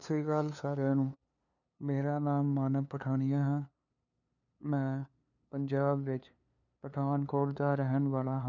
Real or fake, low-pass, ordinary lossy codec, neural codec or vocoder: fake; 7.2 kHz; none; codec, 16 kHz, 2 kbps, FunCodec, trained on LibriTTS, 25 frames a second